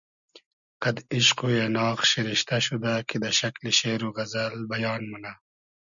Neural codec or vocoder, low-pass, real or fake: none; 7.2 kHz; real